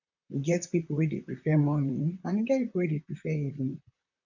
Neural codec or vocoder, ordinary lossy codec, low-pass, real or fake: vocoder, 44.1 kHz, 128 mel bands, Pupu-Vocoder; none; 7.2 kHz; fake